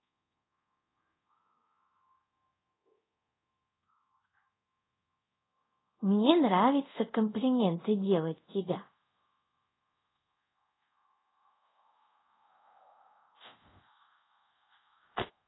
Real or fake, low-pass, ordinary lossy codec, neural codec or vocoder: fake; 7.2 kHz; AAC, 16 kbps; codec, 24 kHz, 0.5 kbps, DualCodec